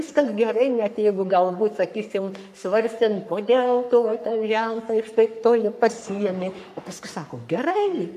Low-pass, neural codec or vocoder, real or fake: 14.4 kHz; codec, 44.1 kHz, 3.4 kbps, Pupu-Codec; fake